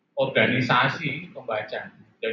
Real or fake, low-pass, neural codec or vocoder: real; 7.2 kHz; none